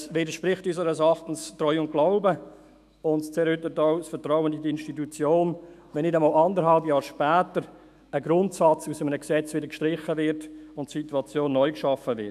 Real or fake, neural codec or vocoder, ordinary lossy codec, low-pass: fake; autoencoder, 48 kHz, 128 numbers a frame, DAC-VAE, trained on Japanese speech; none; 14.4 kHz